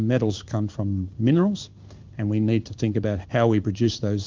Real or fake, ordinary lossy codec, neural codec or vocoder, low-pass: fake; Opus, 24 kbps; codec, 16 kHz in and 24 kHz out, 1 kbps, XY-Tokenizer; 7.2 kHz